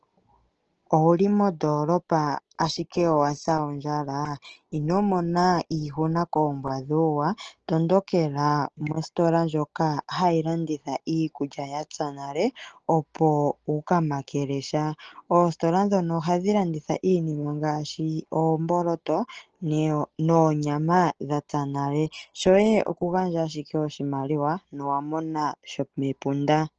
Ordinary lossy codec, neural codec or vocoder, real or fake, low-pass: Opus, 16 kbps; none; real; 7.2 kHz